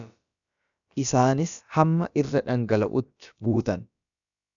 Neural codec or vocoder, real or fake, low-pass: codec, 16 kHz, about 1 kbps, DyCAST, with the encoder's durations; fake; 7.2 kHz